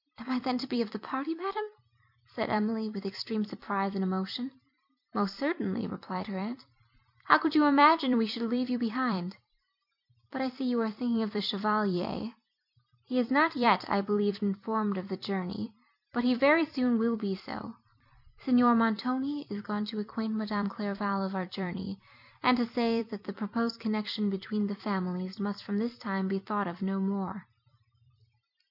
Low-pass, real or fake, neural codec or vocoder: 5.4 kHz; fake; vocoder, 44.1 kHz, 128 mel bands every 256 samples, BigVGAN v2